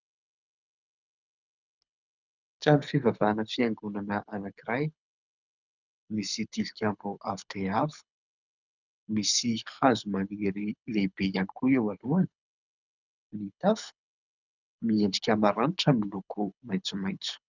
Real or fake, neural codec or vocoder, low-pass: fake; codec, 24 kHz, 6 kbps, HILCodec; 7.2 kHz